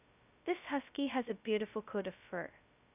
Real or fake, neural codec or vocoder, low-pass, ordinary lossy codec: fake; codec, 16 kHz, 0.2 kbps, FocalCodec; 3.6 kHz; none